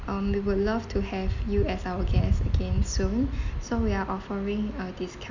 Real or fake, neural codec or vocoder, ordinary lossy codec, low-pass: real; none; none; 7.2 kHz